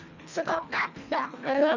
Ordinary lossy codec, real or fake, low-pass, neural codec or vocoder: none; fake; 7.2 kHz; codec, 24 kHz, 1.5 kbps, HILCodec